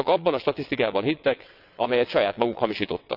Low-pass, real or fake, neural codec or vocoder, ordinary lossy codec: 5.4 kHz; fake; vocoder, 22.05 kHz, 80 mel bands, WaveNeXt; none